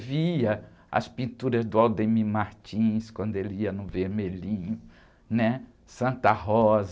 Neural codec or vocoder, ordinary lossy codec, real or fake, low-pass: none; none; real; none